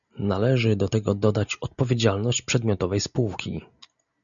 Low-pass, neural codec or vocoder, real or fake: 7.2 kHz; none; real